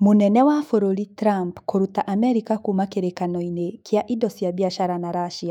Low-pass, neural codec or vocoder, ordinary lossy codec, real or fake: 19.8 kHz; autoencoder, 48 kHz, 128 numbers a frame, DAC-VAE, trained on Japanese speech; none; fake